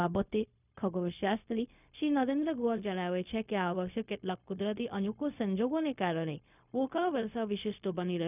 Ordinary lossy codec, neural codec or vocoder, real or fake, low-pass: none; codec, 16 kHz, 0.4 kbps, LongCat-Audio-Codec; fake; 3.6 kHz